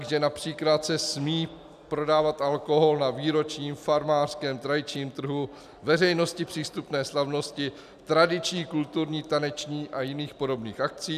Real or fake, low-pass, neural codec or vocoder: real; 14.4 kHz; none